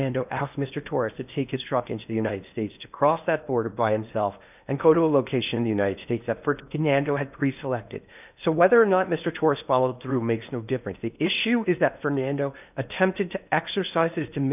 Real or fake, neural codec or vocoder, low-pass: fake; codec, 16 kHz in and 24 kHz out, 0.8 kbps, FocalCodec, streaming, 65536 codes; 3.6 kHz